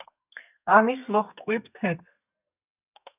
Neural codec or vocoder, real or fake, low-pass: codec, 32 kHz, 1.9 kbps, SNAC; fake; 3.6 kHz